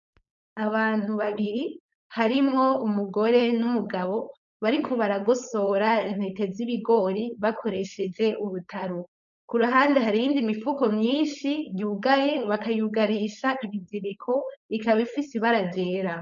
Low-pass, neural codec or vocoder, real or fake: 7.2 kHz; codec, 16 kHz, 4.8 kbps, FACodec; fake